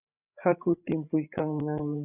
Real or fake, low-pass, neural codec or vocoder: fake; 3.6 kHz; codec, 16 kHz, 16 kbps, FreqCodec, larger model